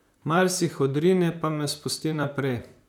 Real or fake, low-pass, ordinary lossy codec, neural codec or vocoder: fake; 19.8 kHz; none; vocoder, 44.1 kHz, 128 mel bands, Pupu-Vocoder